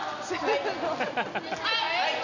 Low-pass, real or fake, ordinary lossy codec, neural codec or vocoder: 7.2 kHz; real; none; none